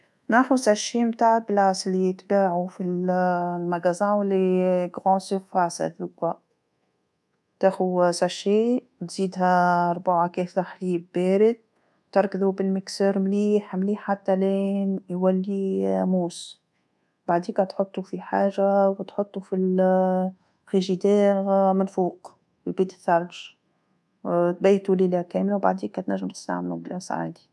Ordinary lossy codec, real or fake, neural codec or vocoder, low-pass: none; fake; codec, 24 kHz, 1.2 kbps, DualCodec; none